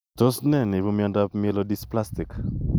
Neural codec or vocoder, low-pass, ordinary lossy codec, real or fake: none; none; none; real